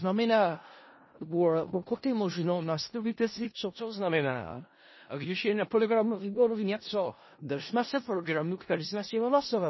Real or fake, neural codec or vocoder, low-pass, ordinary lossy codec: fake; codec, 16 kHz in and 24 kHz out, 0.4 kbps, LongCat-Audio-Codec, four codebook decoder; 7.2 kHz; MP3, 24 kbps